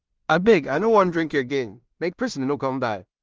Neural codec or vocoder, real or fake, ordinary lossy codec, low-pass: codec, 16 kHz in and 24 kHz out, 0.4 kbps, LongCat-Audio-Codec, two codebook decoder; fake; Opus, 24 kbps; 7.2 kHz